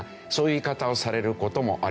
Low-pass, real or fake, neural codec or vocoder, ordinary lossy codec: none; real; none; none